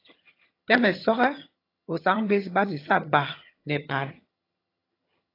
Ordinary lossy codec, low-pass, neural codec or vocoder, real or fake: AAC, 24 kbps; 5.4 kHz; vocoder, 22.05 kHz, 80 mel bands, HiFi-GAN; fake